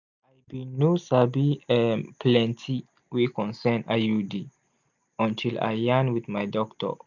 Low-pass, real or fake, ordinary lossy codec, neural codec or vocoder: 7.2 kHz; real; none; none